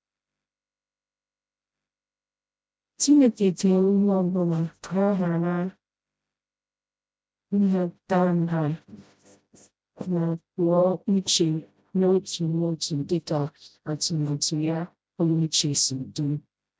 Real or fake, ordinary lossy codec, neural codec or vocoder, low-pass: fake; none; codec, 16 kHz, 0.5 kbps, FreqCodec, smaller model; none